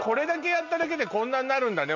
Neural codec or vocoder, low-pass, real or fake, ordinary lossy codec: none; 7.2 kHz; real; none